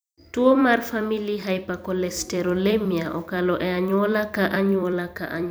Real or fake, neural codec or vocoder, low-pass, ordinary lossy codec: fake; vocoder, 44.1 kHz, 128 mel bands every 256 samples, BigVGAN v2; none; none